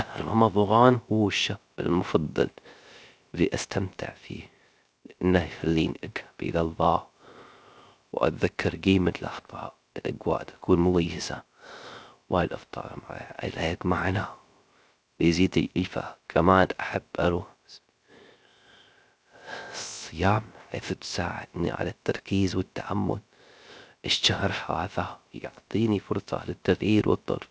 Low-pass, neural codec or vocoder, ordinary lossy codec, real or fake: none; codec, 16 kHz, 0.3 kbps, FocalCodec; none; fake